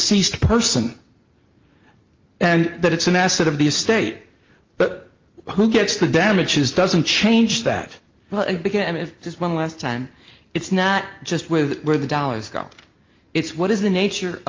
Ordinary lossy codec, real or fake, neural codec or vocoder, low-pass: Opus, 24 kbps; real; none; 7.2 kHz